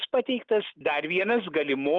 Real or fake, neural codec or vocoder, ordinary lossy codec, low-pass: real; none; Opus, 32 kbps; 7.2 kHz